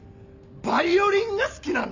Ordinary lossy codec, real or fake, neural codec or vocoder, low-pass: none; real; none; 7.2 kHz